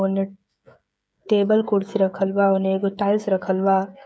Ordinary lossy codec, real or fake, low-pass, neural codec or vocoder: none; fake; none; codec, 16 kHz, 16 kbps, FreqCodec, smaller model